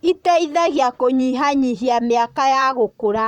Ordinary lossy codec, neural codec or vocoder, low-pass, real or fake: none; vocoder, 44.1 kHz, 128 mel bands, Pupu-Vocoder; 19.8 kHz; fake